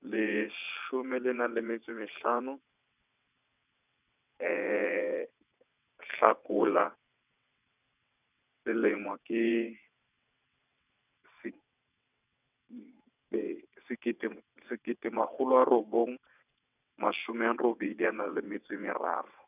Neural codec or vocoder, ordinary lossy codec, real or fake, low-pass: vocoder, 22.05 kHz, 80 mel bands, WaveNeXt; none; fake; 3.6 kHz